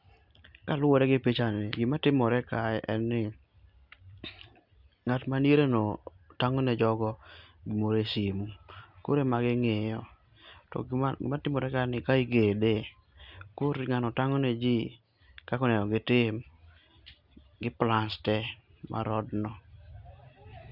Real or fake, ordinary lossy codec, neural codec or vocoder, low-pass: real; Opus, 64 kbps; none; 5.4 kHz